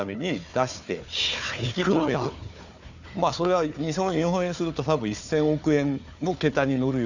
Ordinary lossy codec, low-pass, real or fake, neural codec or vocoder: none; 7.2 kHz; fake; codec, 16 kHz, 4 kbps, FunCodec, trained on Chinese and English, 50 frames a second